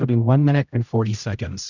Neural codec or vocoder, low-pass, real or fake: codec, 16 kHz, 0.5 kbps, X-Codec, HuBERT features, trained on general audio; 7.2 kHz; fake